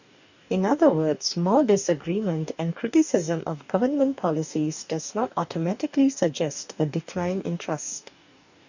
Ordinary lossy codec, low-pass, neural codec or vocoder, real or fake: none; 7.2 kHz; codec, 44.1 kHz, 2.6 kbps, DAC; fake